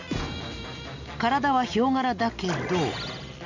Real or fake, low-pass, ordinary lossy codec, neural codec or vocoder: real; 7.2 kHz; none; none